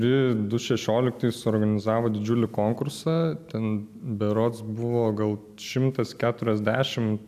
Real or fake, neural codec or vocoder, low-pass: real; none; 14.4 kHz